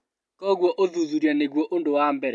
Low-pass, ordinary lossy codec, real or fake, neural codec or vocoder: none; none; real; none